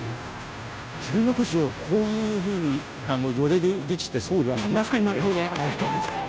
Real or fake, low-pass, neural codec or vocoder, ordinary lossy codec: fake; none; codec, 16 kHz, 0.5 kbps, FunCodec, trained on Chinese and English, 25 frames a second; none